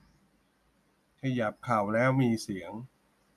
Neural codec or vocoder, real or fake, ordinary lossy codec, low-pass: none; real; none; 14.4 kHz